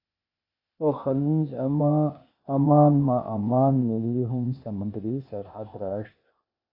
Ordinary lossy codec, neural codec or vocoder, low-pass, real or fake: AAC, 32 kbps; codec, 16 kHz, 0.8 kbps, ZipCodec; 5.4 kHz; fake